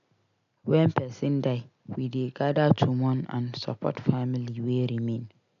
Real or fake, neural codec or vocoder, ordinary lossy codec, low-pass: real; none; none; 7.2 kHz